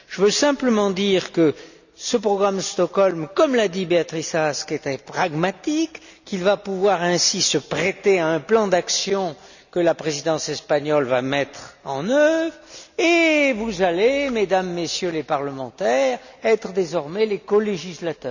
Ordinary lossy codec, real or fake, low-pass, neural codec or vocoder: none; real; 7.2 kHz; none